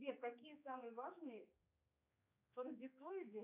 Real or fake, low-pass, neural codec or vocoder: fake; 3.6 kHz; codec, 16 kHz, 2 kbps, X-Codec, HuBERT features, trained on general audio